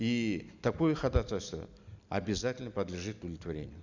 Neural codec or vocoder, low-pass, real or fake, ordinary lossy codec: none; 7.2 kHz; real; none